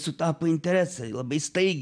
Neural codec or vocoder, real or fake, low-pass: none; real; 9.9 kHz